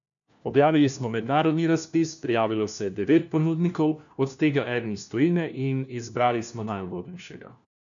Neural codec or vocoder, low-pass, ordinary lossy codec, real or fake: codec, 16 kHz, 1 kbps, FunCodec, trained on LibriTTS, 50 frames a second; 7.2 kHz; none; fake